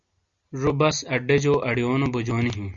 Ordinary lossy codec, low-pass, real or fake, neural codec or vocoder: Opus, 64 kbps; 7.2 kHz; real; none